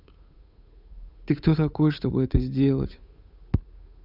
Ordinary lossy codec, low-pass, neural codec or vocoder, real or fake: none; 5.4 kHz; codec, 16 kHz, 8 kbps, FunCodec, trained on Chinese and English, 25 frames a second; fake